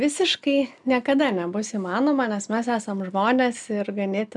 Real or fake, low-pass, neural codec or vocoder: real; 10.8 kHz; none